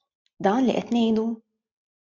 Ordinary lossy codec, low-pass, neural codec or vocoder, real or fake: MP3, 48 kbps; 7.2 kHz; none; real